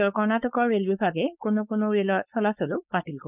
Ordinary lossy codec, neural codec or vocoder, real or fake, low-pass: none; codec, 16 kHz, 4.8 kbps, FACodec; fake; 3.6 kHz